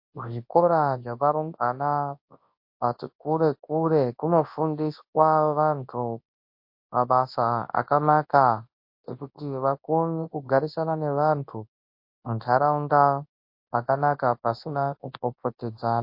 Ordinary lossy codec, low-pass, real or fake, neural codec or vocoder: MP3, 32 kbps; 5.4 kHz; fake; codec, 24 kHz, 0.9 kbps, WavTokenizer, large speech release